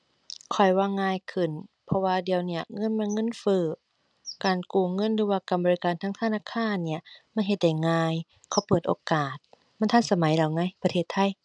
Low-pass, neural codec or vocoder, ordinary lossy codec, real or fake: 9.9 kHz; none; none; real